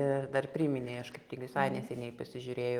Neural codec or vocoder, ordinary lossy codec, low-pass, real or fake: none; Opus, 24 kbps; 19.8 kHz; real